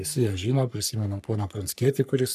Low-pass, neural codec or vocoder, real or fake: 14.4 kHz; codec, 44.1 kHz, 3.4 kbps, Pupu-Codec; fake